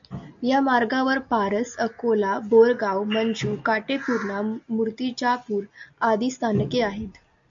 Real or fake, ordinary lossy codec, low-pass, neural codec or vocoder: real; AAC, 64 kbps; 7.2 kHz; none